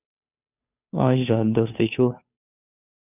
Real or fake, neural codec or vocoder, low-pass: fake; codec, 16 kHz, 2 kbps, FunCodec, trained on Chinese and English, 25 frames a second; 3.6 kHz